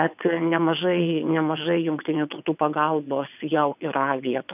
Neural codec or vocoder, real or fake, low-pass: vocoder, 22.05 kHz, 80 mel bands, WaveNeXt; fake; 3.6 kHz